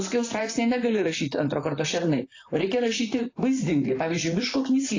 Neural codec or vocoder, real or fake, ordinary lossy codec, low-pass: vocoder, 44.1 kHz, 128 mel bands, Pupu-Vocoder; fake; AAC, 32 kbps; 7.2 kHz